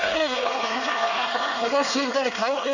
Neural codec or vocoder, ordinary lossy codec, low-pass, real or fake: codec, 24 kHz, 1 kbps, SNAC; none; 7.2 kHz; fake